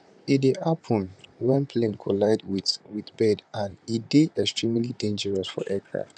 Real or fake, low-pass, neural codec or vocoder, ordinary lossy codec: fake; 9.9 kHz; vocoder, 44.1 kHz, 128 mel bands, Pupu-Vocoder; none